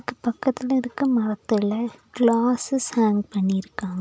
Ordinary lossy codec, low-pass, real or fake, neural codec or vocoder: none; none; real; none